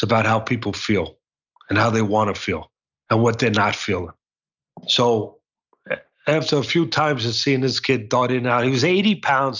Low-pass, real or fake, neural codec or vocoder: 7.2 kHz; real; none